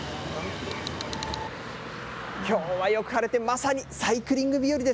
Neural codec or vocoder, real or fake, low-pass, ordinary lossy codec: none; real; none; none